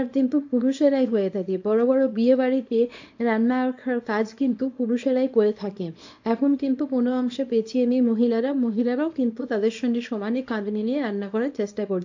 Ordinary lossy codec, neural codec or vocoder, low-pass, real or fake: AAC, 48 kbps; codec, 24 kHz, 0.9 kbps, WavTokenizer, small release; 7.2 kHz; fake